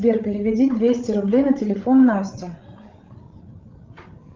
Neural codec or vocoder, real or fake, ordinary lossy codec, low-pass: codec, 16 kHz, 16 kbps, FreqCodec, larger model; fake; Opus, 24 kbps; 7.2 kHz